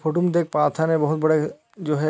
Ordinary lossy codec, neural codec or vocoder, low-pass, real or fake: none; none; none; real